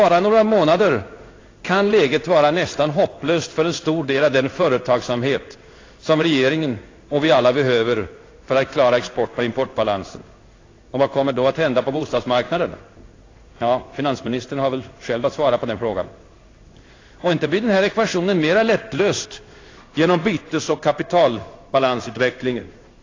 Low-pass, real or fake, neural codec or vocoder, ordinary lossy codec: 7.2 kHz; fake; codec, 16 kHz in and 24 kHz out, 1 kbps, XY-Tokenizer; AAC, 32 kbps